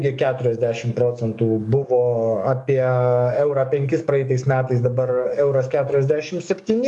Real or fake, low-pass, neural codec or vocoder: fake; 10.8 kHz; codec, 44.1 kHz, 7.8 kbps, DAC